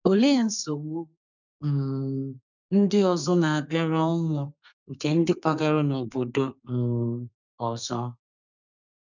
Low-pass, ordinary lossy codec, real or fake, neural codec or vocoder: 7.2 kHz; none; fake; codec, 32 kHz, 1.9 kbps, SNAC